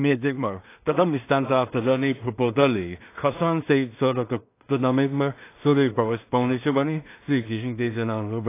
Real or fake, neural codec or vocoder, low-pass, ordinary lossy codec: fake; codec, 16 kHz in and 24 kHz out, 0.4 kbps, LongCat-Audio-Codec, two codebook decoder; 3.6 kHz; AAC, 24 kbps